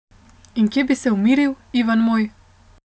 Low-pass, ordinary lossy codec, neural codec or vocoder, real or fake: none; none; none; real